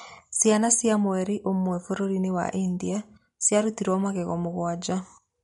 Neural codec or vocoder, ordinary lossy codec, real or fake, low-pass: none; MP3, 48 kbps; real; 19.8 kHz